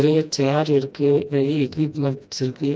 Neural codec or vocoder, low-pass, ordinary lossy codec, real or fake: codec, 16 kHz, 1 kbps, FreqCodec, smaller model; none; none; fake